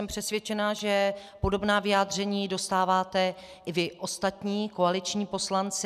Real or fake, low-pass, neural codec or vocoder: real; 14.4 kHz; none